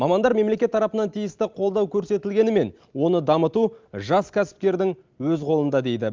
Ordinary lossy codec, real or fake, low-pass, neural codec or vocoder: Opus, 32 kbps; real; 7.2 kHz; none